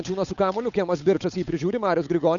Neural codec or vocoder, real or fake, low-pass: codec, 16 kHz, 8 kbps, FunCodec, trained on Chinese and English, 25 frames a second; fake; 7.2 kHz